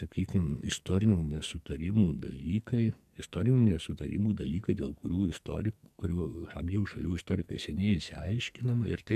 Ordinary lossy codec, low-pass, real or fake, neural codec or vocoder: AAC, 96 kbps; 14.4 kHz; fake; codec, 44.1 kHz, 2.6 kbps, SNAC